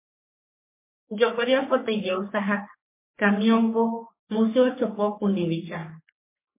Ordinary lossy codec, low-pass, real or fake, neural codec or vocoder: MP3, 24 kbps; 3.6 kHz; fake; codec, 44.1 kHz, 3.4 kbps, Pupu-Codec